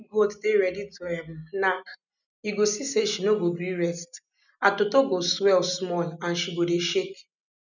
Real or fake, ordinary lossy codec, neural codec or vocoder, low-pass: real; none; none; 7.2 kHz